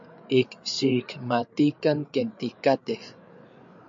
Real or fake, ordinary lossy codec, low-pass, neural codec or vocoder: fake; MP3, 48 kbps; 7.2 kHz; codec, 16 kHz, 8 kbps, FreqCodec, larger model